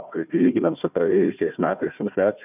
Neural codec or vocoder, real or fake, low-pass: codec, 16 kHz, 1 kbps, FunCodec, trained on Chinese and English, 50 frames a second; fake; 3.6 kHz